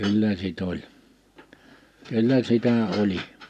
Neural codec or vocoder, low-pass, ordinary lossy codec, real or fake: none; 14.4 kHz; none; real